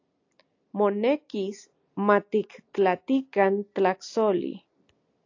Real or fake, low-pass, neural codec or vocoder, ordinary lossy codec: real; 7.2 kHz; none; AAC, 48 kbps